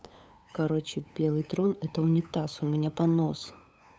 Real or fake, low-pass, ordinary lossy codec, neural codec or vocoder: fake; none; none; codec, 16 kHz, 8 kbps, FunCodec, trained on LibriTTS, 25 frames a second